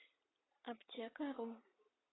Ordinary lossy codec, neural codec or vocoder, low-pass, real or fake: AAC, 16 kbps; none; 7.2 kHz; real